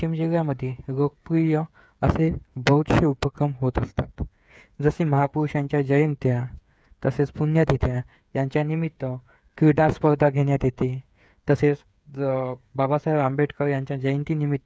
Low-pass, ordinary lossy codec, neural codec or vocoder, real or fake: none; none; codec, 16 kHz, 8 kbps, FreqCodec, smaller model; fake